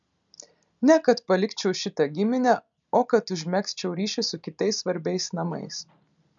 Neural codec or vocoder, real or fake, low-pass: none; real; 7.2 kHz